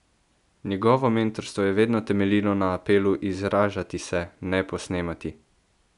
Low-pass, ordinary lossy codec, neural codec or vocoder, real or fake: 10.8 kHz; none; none; real